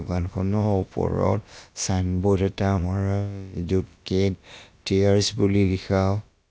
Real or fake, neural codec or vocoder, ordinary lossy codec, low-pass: fake; codec, 16 kHz, about 1 kbps, DyCAST, with the encoder's durations; none; none